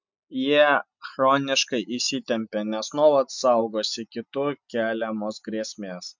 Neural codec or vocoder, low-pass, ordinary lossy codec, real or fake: none; 7.2 kHz; MP3, 64 kbps; real